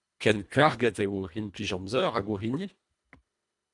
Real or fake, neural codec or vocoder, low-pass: fake; codec, 24 kHz, 1.5 kbps, HILCodec; 10.8 kHz